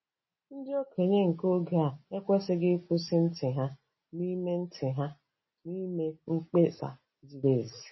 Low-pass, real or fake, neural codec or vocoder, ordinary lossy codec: 7.2 kHz; real; none; MP3, 24 kbps